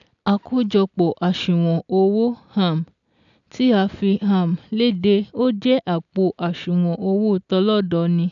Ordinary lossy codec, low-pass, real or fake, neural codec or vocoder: none; 7.2 kHz; real; none